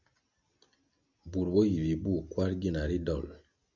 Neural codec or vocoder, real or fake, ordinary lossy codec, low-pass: none; real; Opus, 64 kbps; 7.2 kHz